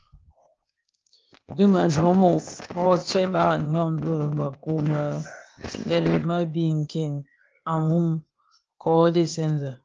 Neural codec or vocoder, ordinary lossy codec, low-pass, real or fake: codec, 16 kHz, 0.8 kbps, ZipCodec; Opus, 24 kbps; 7.2 kHz; fake